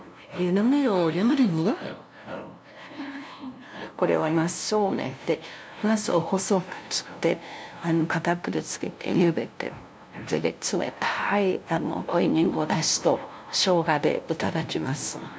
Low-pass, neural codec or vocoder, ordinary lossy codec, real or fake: none; codec, 16 kHz, 0.5 kbps, FunCodec, trained on LibriTTS, 25 frames a second; none; fake